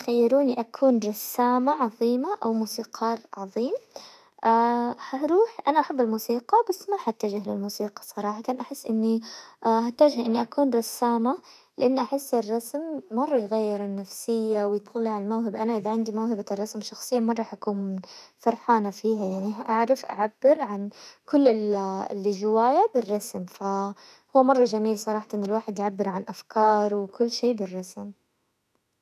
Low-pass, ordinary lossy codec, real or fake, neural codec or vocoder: 14.4 kHz; none; fake; autoencoder, 48 kHz, 32 numbers a frame, DAC-VAE, trained on Japanese speech